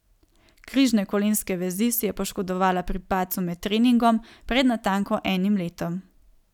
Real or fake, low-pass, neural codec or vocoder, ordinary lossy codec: real; 19.8 kHz; none; none